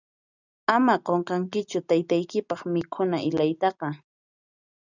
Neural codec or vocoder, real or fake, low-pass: none; real; 7.2 kHz